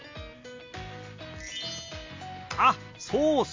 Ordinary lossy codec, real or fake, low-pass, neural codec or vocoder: MP3, 48 kbps; real; 7.2 kHz; none